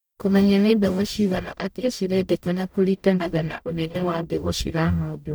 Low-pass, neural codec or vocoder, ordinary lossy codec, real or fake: none; codec, 44.1 kHz, 0.9 kbps, DAC; none; fake